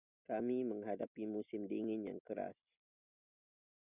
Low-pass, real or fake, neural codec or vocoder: 3.6 kHz; real; none